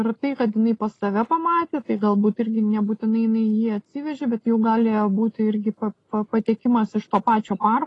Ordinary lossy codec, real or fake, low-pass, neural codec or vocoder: AAC, 32 kbps; real; 10.8 kHz; none